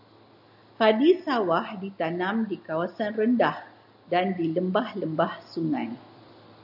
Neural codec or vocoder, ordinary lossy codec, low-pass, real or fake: none; AAC, 48 kbps; 5.4 kHz; real